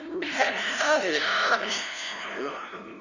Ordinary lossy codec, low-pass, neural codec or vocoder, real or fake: none; 7.2 kHz; codec, 16 kHz, 0.5 kbps, FunCodec, trained on LibriTTS, 25 frames a second; fake